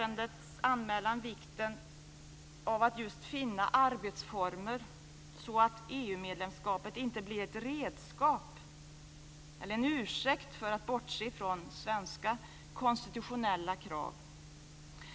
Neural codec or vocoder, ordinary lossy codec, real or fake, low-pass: none; none; real; none